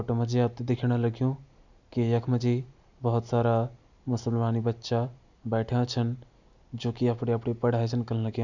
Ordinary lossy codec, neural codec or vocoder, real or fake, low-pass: none; none; real; 7.2 kHz